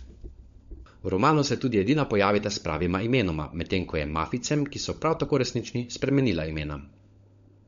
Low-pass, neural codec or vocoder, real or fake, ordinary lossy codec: 7.2 kHz; codec, 16 kHz, 16 kbps, FunCodec, trained on LibriTTS, 50 frames a second; fake; MP3, 48 kbps